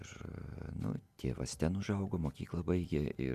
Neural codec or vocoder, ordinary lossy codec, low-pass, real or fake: none; Opus, 64 kbps; 14.4 kHz; real